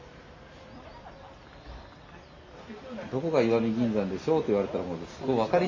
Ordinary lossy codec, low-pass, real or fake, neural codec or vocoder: MP3, 32 kbps; 7.2 kHz; real; none